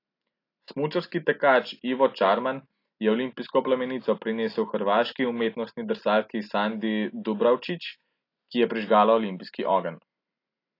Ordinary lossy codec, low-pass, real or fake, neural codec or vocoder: AAC, 32 kbps; 5.4 kHz; real; none